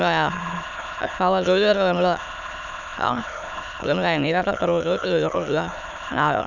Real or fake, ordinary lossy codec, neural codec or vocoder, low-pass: fake; none; autoencoder, 22.05 kHz, a latent of 192 numbers a frame, VITS, trained on many speakers; 7.2 kHz